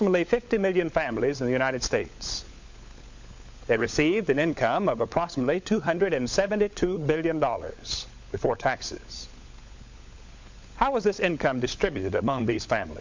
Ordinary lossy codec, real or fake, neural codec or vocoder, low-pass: MP3, 48 kbps; fake; codec, 16 kHz, 16 kbps, FunCodec, trained on LibriTTS, 50 frames a second; 7.2 kHz